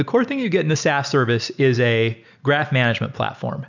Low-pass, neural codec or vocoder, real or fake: 7.2 kHz; none; real